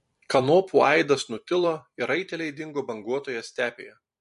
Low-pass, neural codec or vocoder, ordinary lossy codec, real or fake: 14.4 kHz; vocoder, 44.1 kHz, 128 mel bands every 256 samples, BigVGAN v2; MP3, 48 kbps; fake